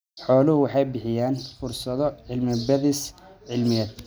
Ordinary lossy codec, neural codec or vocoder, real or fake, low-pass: none; none; real; none